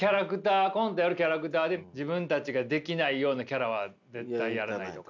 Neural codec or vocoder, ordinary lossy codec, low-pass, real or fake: none; none; 7.2 kHz; real